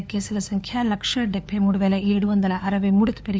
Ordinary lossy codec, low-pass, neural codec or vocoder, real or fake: none; none; codec, 16 kHz, 4 kbps, FunCodec, trained on LibriTTS, 50 frames a second; fake